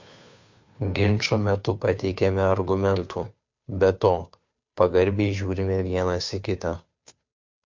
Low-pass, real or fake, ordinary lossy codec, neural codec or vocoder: 7.2 kHz; fake; MP3, 48 kbps; codec, 16 kHz, 2 kbps, FunCodec, trained on Chinese and English, 25 frames a second